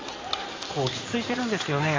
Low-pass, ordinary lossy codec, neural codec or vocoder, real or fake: 7.2 kHz; AAC, 32 kbps; codec, 16 kHz in and 24 kHz out, 2.2 kbps, FireRedTTS-2 codec; fake